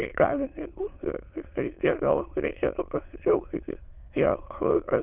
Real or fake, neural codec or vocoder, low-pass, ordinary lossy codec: fake; autoencoder, 22.05 kHz, a latent of 192 numbers a frame, VITS, trained on many speakers; 3.6 kHz; Opus, 24 kbps